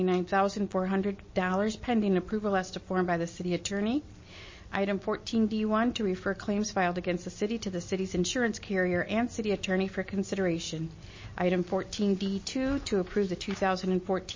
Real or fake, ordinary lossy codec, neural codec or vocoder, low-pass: real; MP3, 32 kbps; none; 7.2 kHz